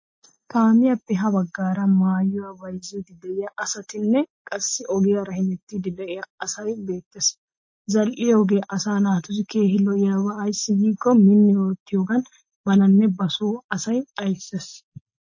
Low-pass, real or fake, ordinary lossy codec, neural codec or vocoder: 7.2 kHz; real; MP3, 32 kbps; none